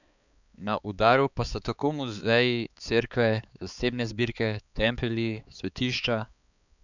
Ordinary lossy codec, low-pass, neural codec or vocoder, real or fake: none; 7.2 kHz; codec, 16 kHz, 4 kbps, X-Codec, HuBERT features, trained on balanced general audio; fake